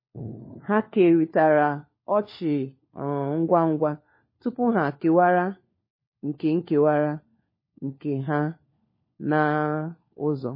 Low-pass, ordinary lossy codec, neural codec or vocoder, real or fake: 5.4 kHz; MP3, 24 kbps; codec, 16 kHz, 4 kbps, FunCodec, trained on LibriTTS, 50 frames a second; fake